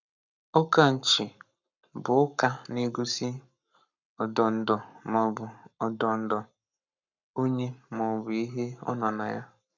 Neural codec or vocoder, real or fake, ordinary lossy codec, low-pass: codec, 44.1 kHz, 7.8 kbps, Pupu-Codec; fake; none; 7.2 kHz